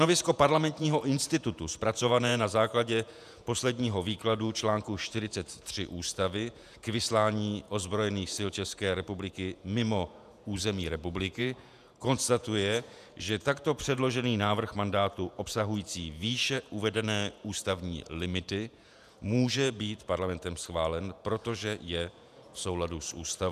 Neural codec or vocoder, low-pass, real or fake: vocoder, 48 kHz, 128 mel bands, Vocos; 14.4 kHz; fake